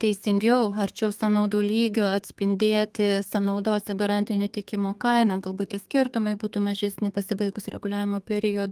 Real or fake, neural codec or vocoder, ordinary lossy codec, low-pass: fake; codec, 32 kHz, 1.9 kbps, SNAC; Opus, 32 kbps; 14.4 kHz